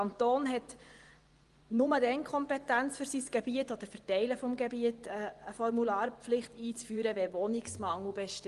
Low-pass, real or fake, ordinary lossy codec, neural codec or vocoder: 10.8 kHz; fake; Opus, 24 kbps; vocoder, 24 kHz, 100 mel bands, Vocos